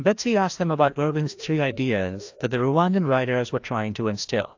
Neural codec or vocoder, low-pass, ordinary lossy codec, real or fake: codec, 16 kHz, 1 kbps, FreqCodec, larger model; 7.2 kHz; AAC, 48 kbps; fake